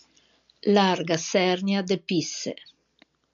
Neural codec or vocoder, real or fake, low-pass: none; real; 7.2 kHz